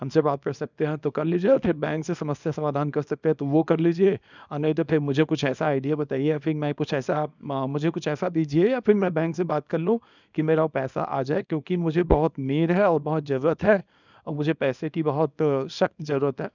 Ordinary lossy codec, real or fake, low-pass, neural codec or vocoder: none; fake; 7.2 kHz; codec, 24 kHz, 0.9 kbps, WavTokenizer, small release